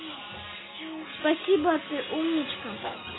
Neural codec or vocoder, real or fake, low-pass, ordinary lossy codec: none; real; 7.2 kHz; AAC, 16 kbps